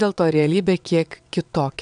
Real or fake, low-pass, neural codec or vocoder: fake; 9.9 kHz; vocoder, 22.05 kHz, 80 mel bands, WaveNeXt